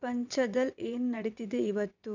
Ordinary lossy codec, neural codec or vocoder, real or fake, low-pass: none; vocoder, 22.05 kHz, 80 mel bands, WaveNeXt; fake; 7.2 kHz